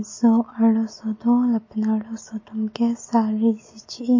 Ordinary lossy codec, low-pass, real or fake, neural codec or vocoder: MP3, 32 kbps; 7.2 kHz; real; none